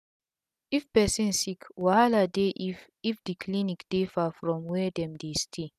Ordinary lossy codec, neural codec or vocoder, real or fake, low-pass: none; none; real; 14.4 kHz